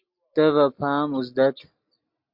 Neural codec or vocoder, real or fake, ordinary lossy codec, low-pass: none; real; MP3, 48 kbps; 5.4 kHz